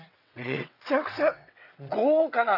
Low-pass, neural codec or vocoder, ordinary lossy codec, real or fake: 5.4 kHz; vocoder, 22.05 kHz, 80 mel bands, WaveNeXt; none; fake